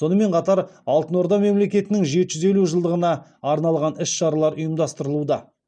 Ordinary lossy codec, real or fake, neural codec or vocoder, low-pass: none; real; none; 9.9 kHz